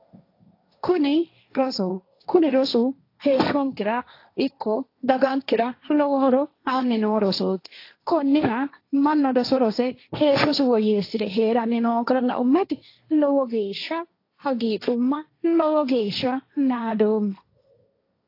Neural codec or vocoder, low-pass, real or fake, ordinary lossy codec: codec, 16 kHz, 1.1 kbps, Voila-Tokenizer; 5.4 kHz; fake; AAC, 32 kbps